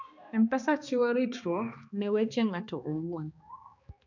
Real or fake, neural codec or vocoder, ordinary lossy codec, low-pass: fake; codec, 16 kHz, 2 kbps, X-Codec, HuBERT features, trained on balanced general audio; none; 7.2 kHz